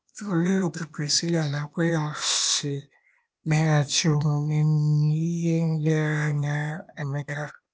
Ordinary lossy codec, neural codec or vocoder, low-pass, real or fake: none; codec, 16 kHz, 0.8 kbps, ZipCodec; none; fake